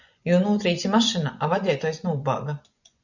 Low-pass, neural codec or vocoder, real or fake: 7.2 kHz; vocoder, 24 kHz, 100 mel bands, Vocos; fake